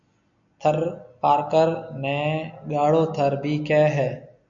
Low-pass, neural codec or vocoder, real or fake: 7.2 kHz; none; real